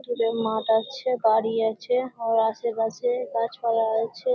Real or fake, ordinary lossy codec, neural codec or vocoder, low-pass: real; none; none; none